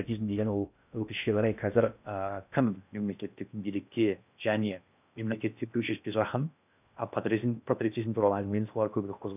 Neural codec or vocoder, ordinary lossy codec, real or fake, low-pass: codec, 16 kHz in and 24 kHz out, 0.6 kbps, FocalCodec, streaming, 4096 codes; none; fake; 3.6 kHz